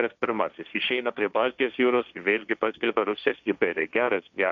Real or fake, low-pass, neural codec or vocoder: fake; 7.2 kHz; codec, 16 kHz, 1.1 kbps, Voila-Tokenizer